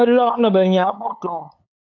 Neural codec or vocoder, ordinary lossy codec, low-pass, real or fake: codec, 16 kHz, 8 kbps, FunCodec, trained on LibriTTS, 25 frames a second; AAC, 48 kbps; 7.2 kHz; fake